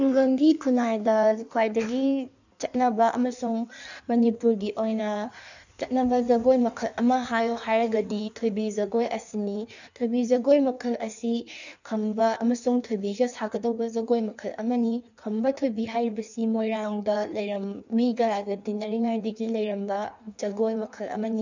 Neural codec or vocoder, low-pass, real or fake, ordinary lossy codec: codec, 16 kHz in and 24 kHz out, 1.1 kbps, FireRedTTS-2 codec; 7.2 kHz; fake; none